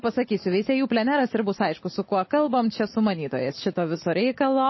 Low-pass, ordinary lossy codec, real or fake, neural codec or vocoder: 7.2 kHz; MP3, 24 kbps; real; none